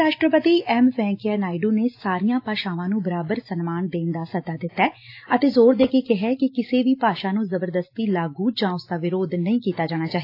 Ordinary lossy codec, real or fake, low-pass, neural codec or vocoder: AAC, 32 kbps; real; 5.4 kHz; none